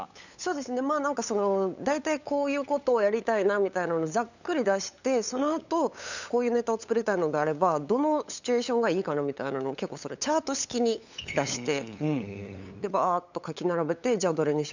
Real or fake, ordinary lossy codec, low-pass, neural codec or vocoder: fake; none; 7.2 kHz; codec, 16 kHz, 8 kbps, FunCodec, trained on LibriTTS, 25 frames a second